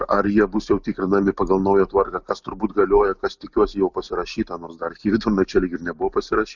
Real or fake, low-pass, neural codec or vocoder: real; 7.2 kHz; none